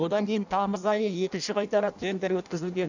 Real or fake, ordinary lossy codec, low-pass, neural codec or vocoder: fake; none; 7.2 kHz; codec, 16 kHz in and 24 kHz out, 0.6 kbps, FireRedTTS-2 codec